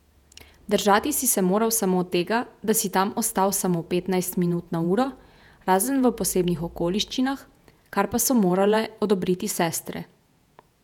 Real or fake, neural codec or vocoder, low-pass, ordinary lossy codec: fake; vocoder, 48 kHz, 128 mel bands, Vocos; 19.8 kHz; none